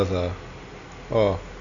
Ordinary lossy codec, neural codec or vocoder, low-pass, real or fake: AAC, 64 kbps; none; 7.2 kHz; real